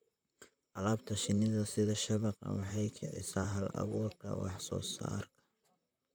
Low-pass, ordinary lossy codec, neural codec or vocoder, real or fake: none; none; vocoder, 44.1 kHz, 128 mel bands, Pupu-Vocoder; fake